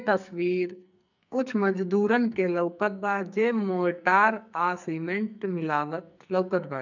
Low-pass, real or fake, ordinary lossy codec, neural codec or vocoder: 7.2 kHz; fake; none; codec, 44.1 kHz, 2.6 kbps, SNAC